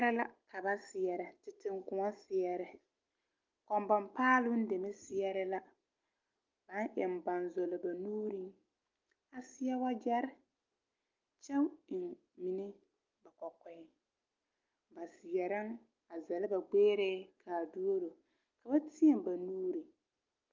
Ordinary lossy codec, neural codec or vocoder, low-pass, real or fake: Opus, 24 kbps; none; 7.2 kHz; real